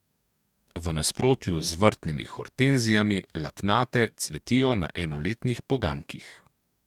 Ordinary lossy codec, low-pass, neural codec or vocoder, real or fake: none; 19.8 kHz; codec, 44.1 kHz, 2.6 kbps, DAC; fake